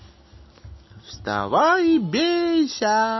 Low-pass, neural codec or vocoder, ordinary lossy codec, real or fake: 7.2 kHz; none; MP3, 24 kbps; real